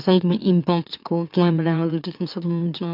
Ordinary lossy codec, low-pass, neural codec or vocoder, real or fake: none; 5.4 kHz; autoencoder, 44.1 kHz, a latent of 192 numbers a frame, MeloTTS; fake